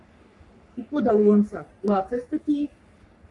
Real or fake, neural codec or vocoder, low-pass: fake; codec, 44.1 kHz, 3.4 kbps, Pupu-Codec; 10.8 kHz